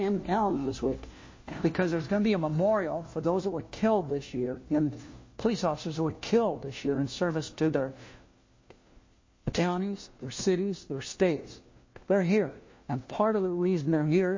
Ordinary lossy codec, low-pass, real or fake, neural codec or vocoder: MP3, 32 kbps; 7.2 kHz; fake; codec, 16 kHz, 1 kbps, FunCodec, trained on LibriTTS, 50 frames a second